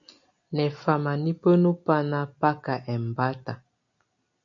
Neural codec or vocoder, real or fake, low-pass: none; real; 7.2 kHz